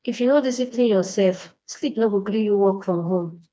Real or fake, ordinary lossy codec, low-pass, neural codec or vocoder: fake; none; none; codec, 16 kHz, 2 kbps, FreqCodec, smaller model